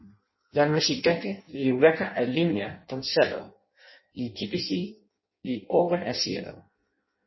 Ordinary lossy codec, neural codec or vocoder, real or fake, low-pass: MP3, 24 kbps; codec, 16 kHz in and 24 kHz out, 0.6 kbps, FireRedTTS-2 codec; fake; 7.2 kHz